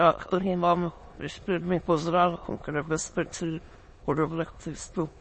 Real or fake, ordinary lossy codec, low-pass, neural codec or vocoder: fake; MP3, 32 kbps; 9.9 kHz; autoencoder, 22.05 kHz, a latent of 192 numbers a frame, VITS, trained on many speakers